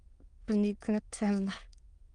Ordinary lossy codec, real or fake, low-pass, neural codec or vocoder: Opus, 32 kbps; fake; 9.9 kHz; autoencoder, 22.05 kHz, a latent of 192 numbers a frame, VITS, trained on many speakers